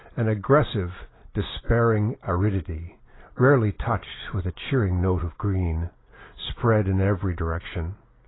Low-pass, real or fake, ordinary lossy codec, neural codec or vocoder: 7.2 kHz; real; AAC, 16 kbps; none